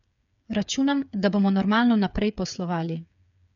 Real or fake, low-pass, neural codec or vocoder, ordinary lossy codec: fake; 7.2 kHz; codec, 16 kHz, 8 kbps, FreqCodec, smaller model; none